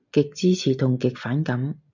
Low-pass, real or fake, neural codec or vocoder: 7.2 kHz; real; none